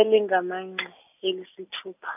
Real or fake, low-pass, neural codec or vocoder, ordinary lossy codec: real; 3.6 kHz; none; none